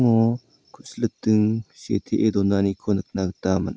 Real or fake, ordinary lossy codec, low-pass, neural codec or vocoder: real; none; none; none